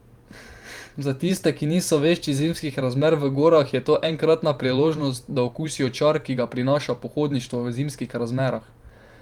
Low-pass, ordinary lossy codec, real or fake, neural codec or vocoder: 19.8 kHz; Opus, 32 kbps; fake; vocoder, 44.1 kHz, 128 mel bands every 512 samples, BigVGAN v2